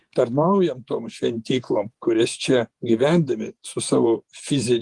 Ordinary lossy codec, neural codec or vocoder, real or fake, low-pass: Opus, 24 kbps; vocoder, 48 kHz, 128 mel bands, Vocos; fake; 10.8 kHz